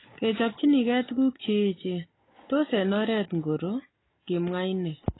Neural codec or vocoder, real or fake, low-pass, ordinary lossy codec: none; real; 7.2 kHz; AAC, 16 kbps